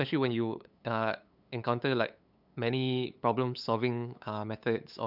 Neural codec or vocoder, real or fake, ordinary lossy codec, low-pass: codec, 16 kHz, 8 kbps, FunCodec, trained on LibriTTS, 25 frames a second; fake; none; 5.4 kHz